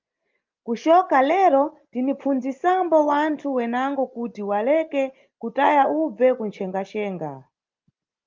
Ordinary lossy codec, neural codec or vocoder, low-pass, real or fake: Opus, 32 kbps; none; 7.2 kHz; real